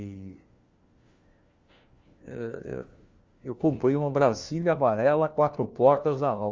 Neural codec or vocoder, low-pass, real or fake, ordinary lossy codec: codec, 16 kHz, 1 kbps, FunCodec, trained on LibriTTS, 50 frames a second; 7.2 kHz; fake; Opus, 32 kbps